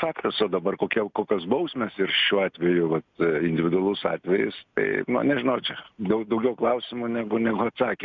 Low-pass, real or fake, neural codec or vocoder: 7.2 kHz; real; none